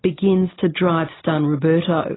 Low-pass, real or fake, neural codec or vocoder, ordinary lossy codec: 7.2 kHz; real; none; AAC, 16 kbps